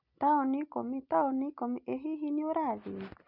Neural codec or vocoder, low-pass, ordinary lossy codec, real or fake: none; 5.4 kHz; none; real